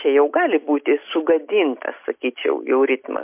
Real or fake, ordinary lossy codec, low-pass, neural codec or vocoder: real; MP3, 32 kbps; 3.6 kHz; none